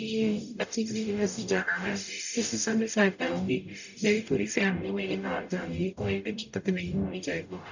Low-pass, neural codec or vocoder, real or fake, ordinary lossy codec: 7.2 kHz; codec, 44.1 kHz, 0.9 kbps, DAC; fake; none